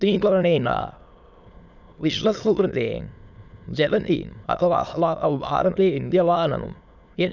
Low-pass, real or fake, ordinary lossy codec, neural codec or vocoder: 7.2 kHz; fake; none; autoencoder, 22.05 kHz, a latent of 192 numbers a frame, VITS, trained on many speakers